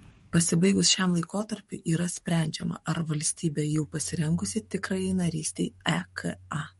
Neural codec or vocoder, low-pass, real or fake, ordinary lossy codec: codec, 44.1 kHz, 7.8 kbps, DAC; 19.8 kHz; fake; MP3, 48 kbps